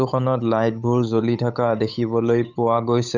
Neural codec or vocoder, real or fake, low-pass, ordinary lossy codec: codec, 16 kHz, 8 kbps, FunCodec, trained on Chinese and English, 25 frames a second; fake; 7.2 kHz; none